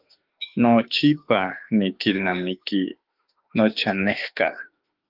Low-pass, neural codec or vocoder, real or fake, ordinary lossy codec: 5.4 kHz; autoencoder, 48 kHz, 32 numbers a frame, DAC-VAE, trained on Japanese speech; fake; Opus, 32 kbps